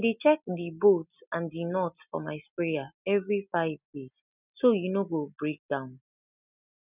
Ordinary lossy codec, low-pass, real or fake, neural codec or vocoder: none; 3.6 kHz; real; none